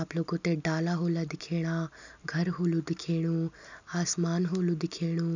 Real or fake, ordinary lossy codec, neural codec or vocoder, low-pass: real; none; none; 7.2 kHz